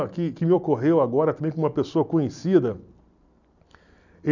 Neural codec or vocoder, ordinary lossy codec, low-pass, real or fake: none; none; 7.2 kHz; real